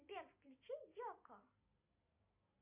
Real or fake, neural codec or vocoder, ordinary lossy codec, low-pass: real; none; MP3, 32 kbps; 3.6 kHz